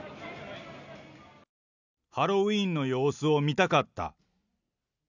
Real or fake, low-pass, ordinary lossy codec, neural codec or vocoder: real; 7.2 kHz; none; none